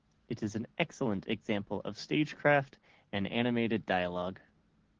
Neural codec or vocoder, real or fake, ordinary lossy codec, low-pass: none; real; Opus, 16 kbps; 7.2 kHz